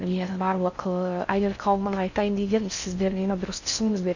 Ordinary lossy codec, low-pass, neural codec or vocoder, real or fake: none; 7.2 kHz; codec, 16 kHz in and 24 kHz out, 0.6 kbps, FocalCodec, streaming, 4096 codes; fake